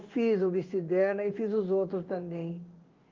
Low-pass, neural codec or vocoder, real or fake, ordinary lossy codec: 7.2 kHz; codec, 16 kHz in and 24 kHz out, 1 kbps, XY-Tokenizer; fake; Opus, 24 kbps